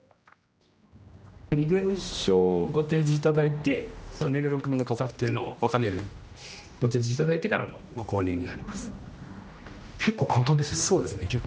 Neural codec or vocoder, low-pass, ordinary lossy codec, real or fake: codec, 16 kHz, 1 kbps, X-Codec, HuBERT features, trained on general audio; none; none; fake